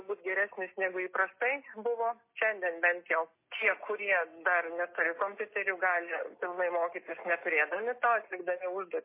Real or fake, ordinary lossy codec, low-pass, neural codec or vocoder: real; MP3, 24 kbps; 3.6 kHz; none